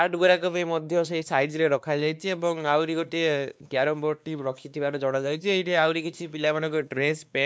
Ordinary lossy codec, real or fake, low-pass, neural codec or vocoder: none; fake; none; codec, 16 kHz, 2 kbps, X-Codec, WavLM features, trained on Multilingual LibriSpeech